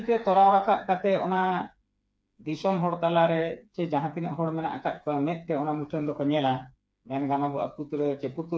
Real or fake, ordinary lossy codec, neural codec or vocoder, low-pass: fake; none; codec, 16 kHz, 4 kbps, FreqCodec, smaller model; none